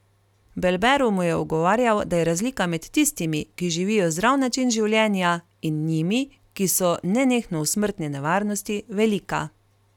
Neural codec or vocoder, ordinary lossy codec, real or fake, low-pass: none; none; real; 19.8 kHz